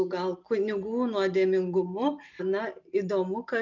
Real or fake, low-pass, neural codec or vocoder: real; 7.2 kHz; none